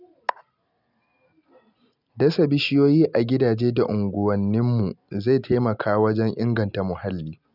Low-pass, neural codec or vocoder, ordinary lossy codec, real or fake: 5.4 kHz; none; none; real